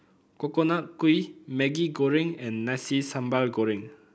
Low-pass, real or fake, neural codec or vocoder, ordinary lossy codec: none; real; none; none